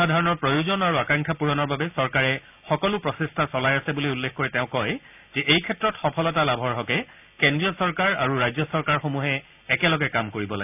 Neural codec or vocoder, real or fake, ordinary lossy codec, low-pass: none; real; none; 3.6 kHz